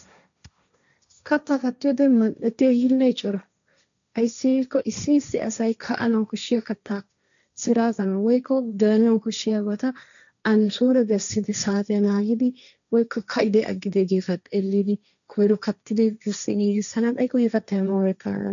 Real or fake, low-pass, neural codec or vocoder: fake; 7.2 kHz; codec, 16 kHz, 1.1 kbps, Voila-Tokenizer